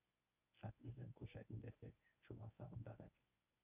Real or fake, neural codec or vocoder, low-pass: fake; codec, 24 kHz, 0.9 kbps, WavTokenizer, medium speech release version 1; 3.6 kHz